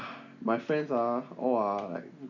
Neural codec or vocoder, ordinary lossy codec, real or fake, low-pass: none; none; real; 7.2 kHz